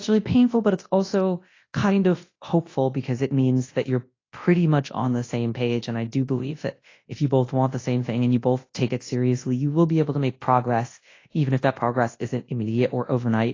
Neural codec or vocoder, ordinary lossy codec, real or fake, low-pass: codec, 24 kHz, 0.9 kbps, WavTokenizer, large speech release; AAC, 32 kbps; fake; 7.2 kHz